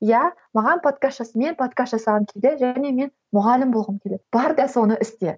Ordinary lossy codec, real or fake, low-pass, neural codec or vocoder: none; real; none; none